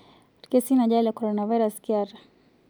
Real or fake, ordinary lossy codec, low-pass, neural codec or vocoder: real; none; none; none